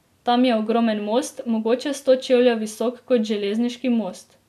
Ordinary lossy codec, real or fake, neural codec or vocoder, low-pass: none; real; none; 14.4 kHz